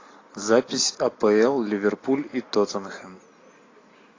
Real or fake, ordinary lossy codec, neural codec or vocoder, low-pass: real; AAC, 32 kbps; none; 7.2 kHz